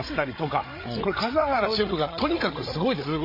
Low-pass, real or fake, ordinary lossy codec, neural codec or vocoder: 5.4 kHz; fake; MP3, 24 kbps; codec, 16 kHz, 16 kbps, FreqCodec, larger model